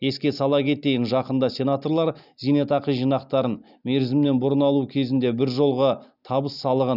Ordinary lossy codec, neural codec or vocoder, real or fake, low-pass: none; none; real; 5.4 kHz